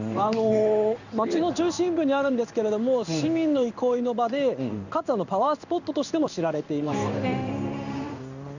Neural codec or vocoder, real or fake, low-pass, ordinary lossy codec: codec, 16 kHz in and 24 kHz out, 1 kbps, XY-Tokenizer; fake; 7.2 kHz; none